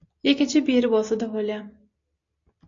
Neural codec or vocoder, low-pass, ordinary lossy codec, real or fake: none; 7.2 kHz; AAC, 48 kbps; real